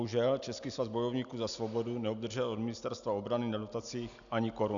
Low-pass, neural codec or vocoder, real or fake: 7.2 kHz; none; real